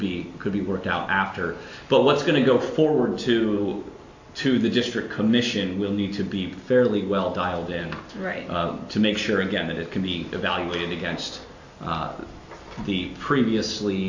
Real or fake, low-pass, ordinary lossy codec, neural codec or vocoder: real; 7.2 kHz; AAC, 48 kbps; none